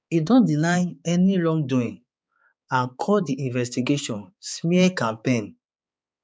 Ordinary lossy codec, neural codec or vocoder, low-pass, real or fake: none; codec, 16 kHz, 4 kbps, X-Codec, HuBERT features, trained on balanced general audio; none; fake